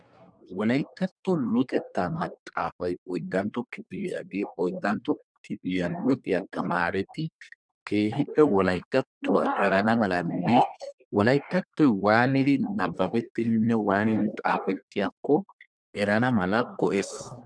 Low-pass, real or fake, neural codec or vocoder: 9.9 kHz; fake; codec, 24 kHz, 1 kbps, SNAC